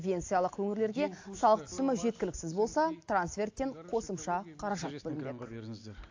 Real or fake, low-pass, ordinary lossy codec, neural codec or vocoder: real; 7.2 kHz; AAC, 48 kbps; none